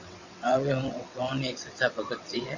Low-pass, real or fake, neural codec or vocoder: 7.2 kHz; fake; vocoder, 22.05 kHz, 80 mel bands, WaveNeXt